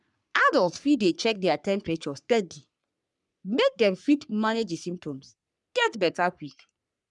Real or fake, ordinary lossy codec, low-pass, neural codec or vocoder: fake; none; 10.8 kHz; codec, 44.1 kHz, 3.4 kbps, Pupu-Codec